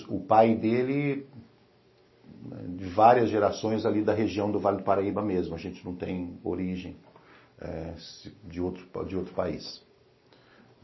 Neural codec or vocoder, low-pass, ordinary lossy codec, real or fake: none; 7.2 kHz; MP3, 24 kbps; real